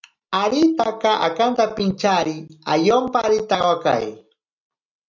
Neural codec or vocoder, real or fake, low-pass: none; real; 7.2 kHz